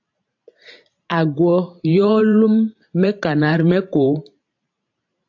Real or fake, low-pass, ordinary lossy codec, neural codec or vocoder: fake; 7.2 kHz; AAC, 48 kbps; vocoder, 44.1 kHz, 128 mel bands every 512 samples, BigVGAN v2